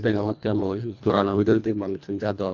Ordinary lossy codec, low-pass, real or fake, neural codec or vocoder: none; 7.2 kHz; fake; codec, 24 kHz, 1.5 kbps, HILCodec